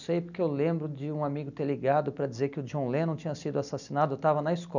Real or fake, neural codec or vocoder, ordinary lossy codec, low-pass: real; none; none; 7.2 kHz